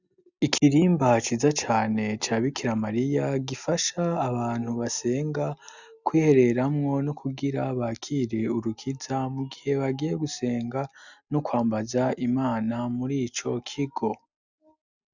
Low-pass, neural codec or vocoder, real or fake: 7.2 kHz; none; real